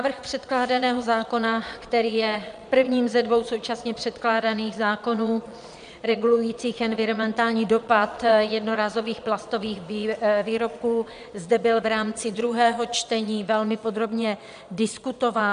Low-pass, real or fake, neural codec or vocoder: 9.9 kHz; fake; vocoder, 22.05 kHz, 80 mel bands, Vocos